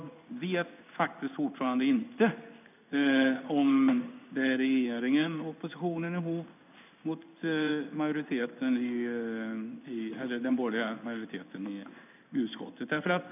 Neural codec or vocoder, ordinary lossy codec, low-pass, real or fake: codec, 16 kHz in and 24 kHz out, 1 kbps, XY-Tokenizer; none; 3.6 kHz; fake